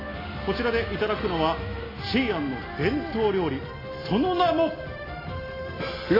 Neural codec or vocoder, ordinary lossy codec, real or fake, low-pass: none; AAC, 24 kbps; real; 5.4 kHz